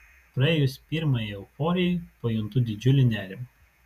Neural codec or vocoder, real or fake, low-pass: vocoder, 48 kHz, 128 mel bands, Vocos; fake; 14.4 kHz